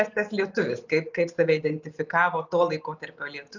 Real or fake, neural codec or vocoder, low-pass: real; none; 7.2 kHz